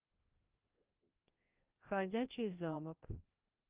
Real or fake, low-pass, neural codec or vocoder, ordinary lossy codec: fake; 3.6 kHz; codec, 16 kHz, 1 kbps, FreqCodec, larger model; Opus, 32 kbps